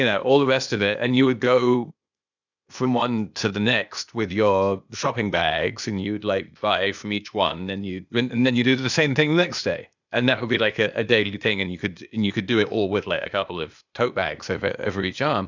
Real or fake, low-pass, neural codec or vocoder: fake; 7.2 kHz; codec, 16 kHz, 0.8 kbps, ZipCodec